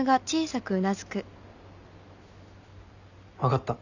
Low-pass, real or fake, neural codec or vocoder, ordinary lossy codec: 7.2 kHz; real; none; none